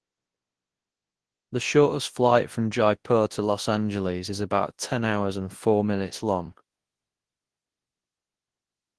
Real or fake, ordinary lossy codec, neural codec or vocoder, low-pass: fake; Opus, 16 kbps; codec, 24 kHz, 0.9 kbps, WavTokenizer, large speech release; 10.8 kHz